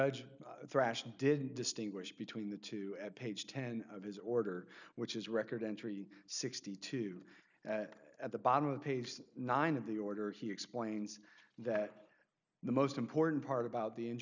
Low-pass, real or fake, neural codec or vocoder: 7.2 kHz; real; none